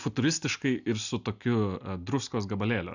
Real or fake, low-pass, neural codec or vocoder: real; 7.2 kHz; none